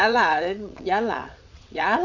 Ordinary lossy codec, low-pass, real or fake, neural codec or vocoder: none; 7.2 kHz; fake; vocoder, 22.05 kHz, 80 mel bands, WaveNeXt